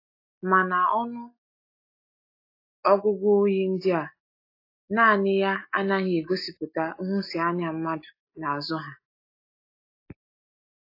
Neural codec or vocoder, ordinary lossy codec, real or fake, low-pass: none; AAC, 32 kbps; real; 5.4 kHz